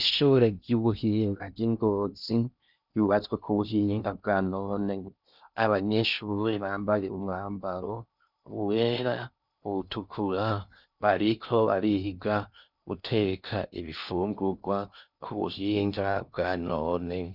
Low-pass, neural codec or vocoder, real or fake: 5.4 kHz; codec, 16 kHz in and 24 kHz out, 0.6 kbps, FocalCodec, streaming, 2048 codes; fake